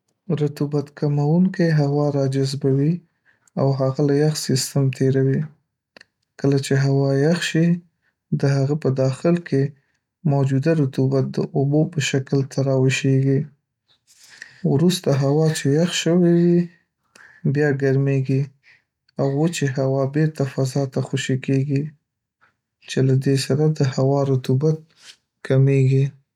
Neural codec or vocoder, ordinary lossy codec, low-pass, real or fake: none; none; 19.8 kHz; real